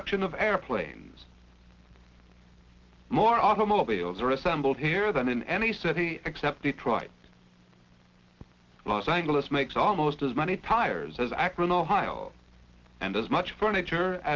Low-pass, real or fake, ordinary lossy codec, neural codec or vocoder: 7.2 kHz; real; Opus, 16 kbps; none